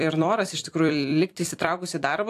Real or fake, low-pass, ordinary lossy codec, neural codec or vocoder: fake; 14.4 kHz; AAC, 64 kbps; vocoder, 44.1 kHz, 128 mel bands every 256 samples, BigVGAN v2